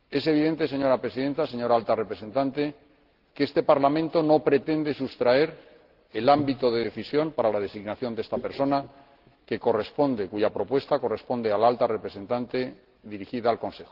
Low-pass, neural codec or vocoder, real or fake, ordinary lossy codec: 5.4 kHz; none; real; Opus, 16 kbps